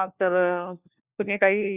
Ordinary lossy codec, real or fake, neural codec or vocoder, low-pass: Opus, 64 kbps; fake; codec, 16 kHz, 1 kbps, FunCodec, trained on LibriTTS, 50 frames a second; 3.6 kHz